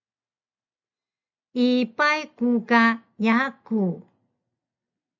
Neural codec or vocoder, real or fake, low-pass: none; real; 7.2 kHz